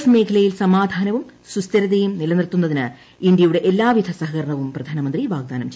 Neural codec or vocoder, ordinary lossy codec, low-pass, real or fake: none; none; none; real